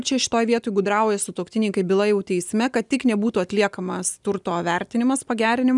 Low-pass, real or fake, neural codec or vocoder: 10.8 kHz; real; none